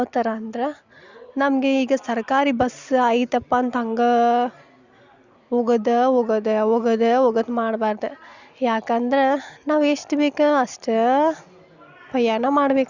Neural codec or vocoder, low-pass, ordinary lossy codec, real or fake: none; 7.2 kHz; Opus, 64 kbps; real